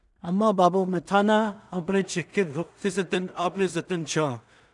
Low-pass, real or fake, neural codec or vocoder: 10.8 kHz; fake; codec, 16 kHz in and 24 kHz out, 0.4 kbps, LongCat-Audio-Codec, two codebook decoder